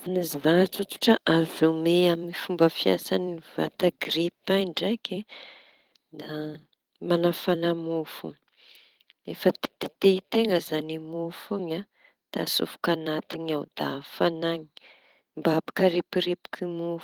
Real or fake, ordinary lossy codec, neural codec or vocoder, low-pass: fake; Opus, 24 kbps; vocoder, 44.1 kHz, 128 mel bands every 256 samples, BigVGAN v2; 19.8 kHz